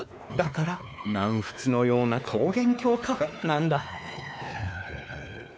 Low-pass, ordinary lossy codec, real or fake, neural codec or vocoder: none; none; fake; codec, 16 kHz, 2 kbps, X-Codec, WavLM features, trained on Multilingual LibriSpeech